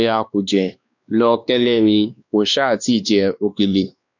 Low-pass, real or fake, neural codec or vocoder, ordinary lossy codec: 7.2 kHz; fake; codec, 16 kHz, 2 kbps, X-Codec, WavLM features, trained on Multilingual LibriSpeech; none